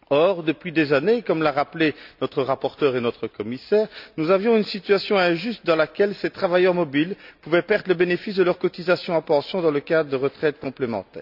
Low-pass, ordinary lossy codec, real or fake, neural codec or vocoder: 5.4 kHz; none; real; none